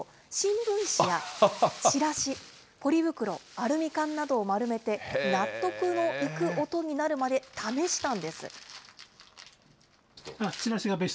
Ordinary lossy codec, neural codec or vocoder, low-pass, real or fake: none; none; none; real